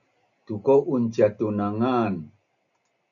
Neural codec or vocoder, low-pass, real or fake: none; 7.2 kHz; real